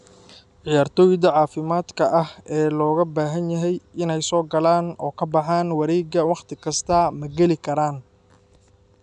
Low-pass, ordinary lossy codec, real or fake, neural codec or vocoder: 10.8 kHz; none; real; none